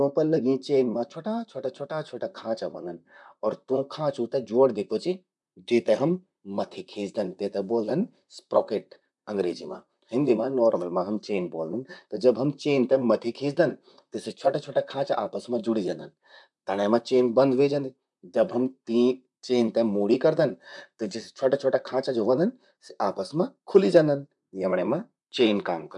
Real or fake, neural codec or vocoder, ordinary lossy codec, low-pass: fake; vocoder, 44.1 kHz, 128 mel bands, Pupu-Vocoder; none; 10.8 kHz